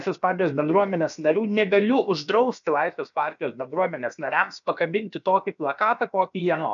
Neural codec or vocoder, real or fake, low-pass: codec, 16 kHz, about 1 kbps, DyCAST, with the encoder's durations; fake; 7.2 kHz